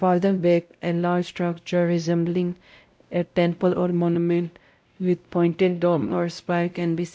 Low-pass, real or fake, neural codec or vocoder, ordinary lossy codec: none; fake; codec, 16 kHz, 0.5 kbps, X-Codec, WavLM features, trained on Multilingual LibriSpeech; none